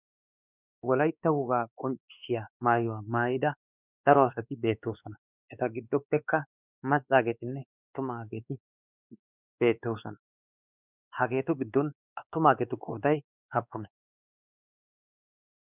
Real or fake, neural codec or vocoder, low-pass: fake; codec, 16 kHz, 2 kbps, X-Codec, WavLM features, trained on Multilingual LibriSpeech; 3.6 kHz